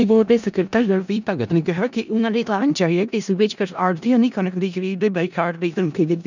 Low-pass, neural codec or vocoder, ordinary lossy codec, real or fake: 7.2 kHz; codec, 16 kHz in and 24 kHz out, 0.4 kbps, LongCat-Audio-Codec, four codebook decoder; none; fake